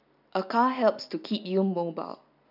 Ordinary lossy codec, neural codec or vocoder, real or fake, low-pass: none; none; real; 5.4 kHz